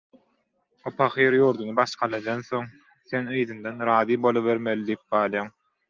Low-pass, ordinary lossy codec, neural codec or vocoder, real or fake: 7.2 kHz; Opus, 24 kbps; none; real